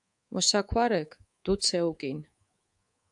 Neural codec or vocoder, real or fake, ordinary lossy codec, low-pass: codec, 24 kHz, 3.1 kbps, DualCodec; fake; AAC, 64 kbps; 10.8 kHz